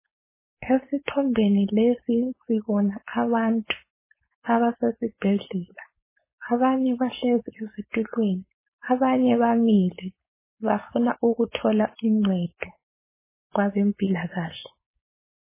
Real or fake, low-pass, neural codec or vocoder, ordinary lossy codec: fake; 3.6 kHz; codec, 16 kHz, 4.8 kbps, FACodec; MP3, 16 kbps